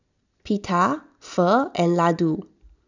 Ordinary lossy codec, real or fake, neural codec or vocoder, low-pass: none; real; none; 7.2 kHz